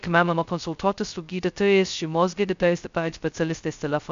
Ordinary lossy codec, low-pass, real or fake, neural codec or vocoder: MP3, 64 kbps; 7.2 kHz; fake; codec, 16 kHz, 0.2 kbps, FocalCodec